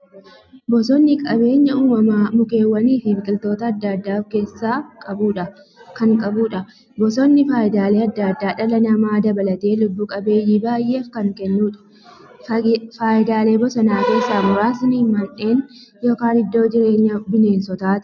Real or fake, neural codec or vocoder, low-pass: real; none; 7.2 kHz